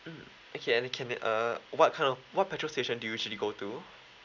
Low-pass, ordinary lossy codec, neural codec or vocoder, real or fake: 7.2 kHz; none; none; real